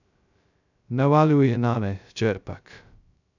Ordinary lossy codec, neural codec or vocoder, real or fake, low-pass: none; codec, 16 kHz, 0.2 kbps, FocalCodec; fake; 7.2 kHz